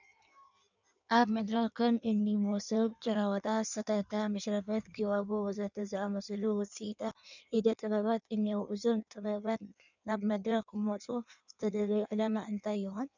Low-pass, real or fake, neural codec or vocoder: 7.2 kHz; fake; codec, 16 kHz in and 24 kHz out, 1.1 kbps, FireRedTTS-2 codec